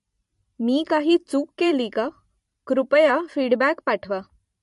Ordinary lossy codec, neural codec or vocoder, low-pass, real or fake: MP3, 48 kbps; vocoder, 44.1 kHz, 128 mel bands every 512 samples, BigVGAN v2; 14.4 kHz; fake